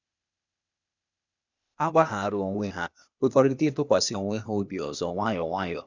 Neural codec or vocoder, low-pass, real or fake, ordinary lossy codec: codec, 16 kHz, 0.8 kbps, ZipCodec; 7.2 kHz; fake; none